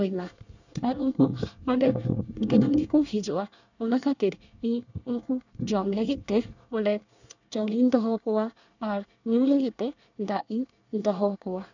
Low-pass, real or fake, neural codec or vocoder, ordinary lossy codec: 7.2 kHz; fake; codec, 24 kHz, 1 kbps, SNAC; none